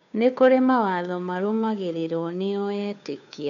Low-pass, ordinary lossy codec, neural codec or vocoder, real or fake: 7.2 kHz; none; codec, 16 kHz, 2 kbps, FunCodec, trained on Chinese and English, 25 frames a second; fake